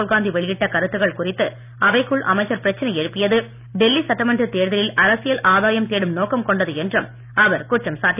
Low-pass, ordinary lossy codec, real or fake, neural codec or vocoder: 3.6 kHz; none; real; none